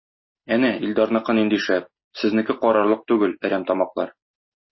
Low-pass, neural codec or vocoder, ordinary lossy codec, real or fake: 7.2 kHz; none; MP3, 24 kbps; real